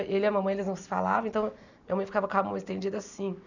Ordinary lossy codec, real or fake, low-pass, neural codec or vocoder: none; real; 7.2 kHz; none